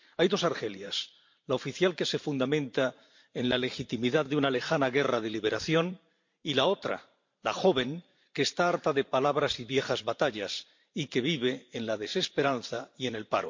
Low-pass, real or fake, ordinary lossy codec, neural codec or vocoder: 7.2 kHz; fake; MP3, 48 kbps; vocoder, 44.1 kHz, 128 mel bands every 512 samples, BigVGAN v2